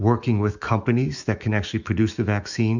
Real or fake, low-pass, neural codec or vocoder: fake; 7.2 kHz; autoencoder, 48 kHz, 128 numbers a frame, DAC-VAE, trained on Japanese speech